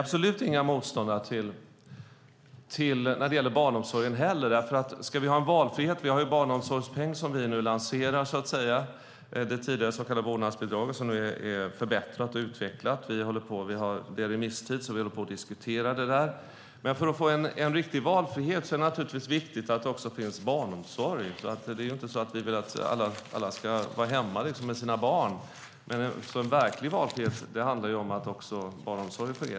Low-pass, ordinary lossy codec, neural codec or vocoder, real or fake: none; none; none; real